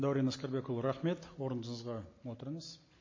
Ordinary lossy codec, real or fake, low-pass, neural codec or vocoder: MP3, 32 kbps; real; 7.2 kHz; none